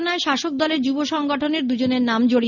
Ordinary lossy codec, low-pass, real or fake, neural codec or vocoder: none; 7.2 kHz; real; none